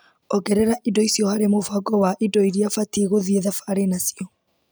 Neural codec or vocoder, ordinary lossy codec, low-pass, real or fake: none; none; none; real